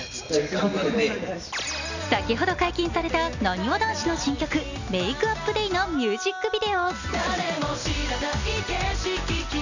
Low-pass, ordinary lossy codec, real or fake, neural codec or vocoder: 7.2 kHz; none; real; none